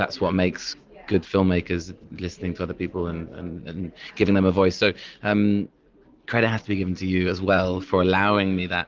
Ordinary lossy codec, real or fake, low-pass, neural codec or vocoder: Opus, 32 kbps; real; 7.2 kHz; none